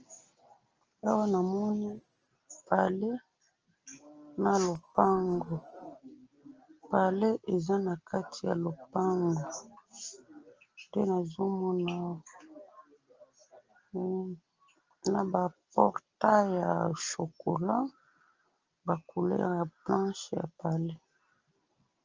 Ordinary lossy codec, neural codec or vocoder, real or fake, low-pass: Opus, 24 kbps; none; real; 7.2 kHz